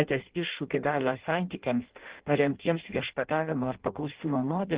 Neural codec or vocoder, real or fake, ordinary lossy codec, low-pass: codec, 16 kHz in and 24 kHz out, 0.6 kbps, FireRedTTS-2 codec; fake; Opus, 16 kbps; 3.6 kHz